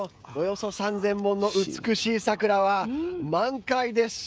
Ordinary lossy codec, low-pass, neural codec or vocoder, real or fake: none; none; codec, 16 kHz, 16 kbps, FreqCodec, smaller model; fake